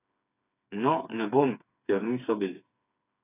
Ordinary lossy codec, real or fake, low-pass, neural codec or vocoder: AAC, 24 kbps; fake; 3.6 kHz; codec, 16 kHz, 4 kbps, FreqCodec, smaller model